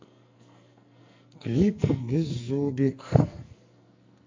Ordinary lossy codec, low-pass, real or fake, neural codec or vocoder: MP3, 48 kbps; 7.2 kHz; fake; codec, 44.1 kHz, 2.6 kbps, SNAC